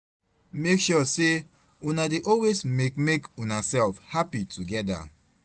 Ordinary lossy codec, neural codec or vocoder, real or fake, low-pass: none; none; real; none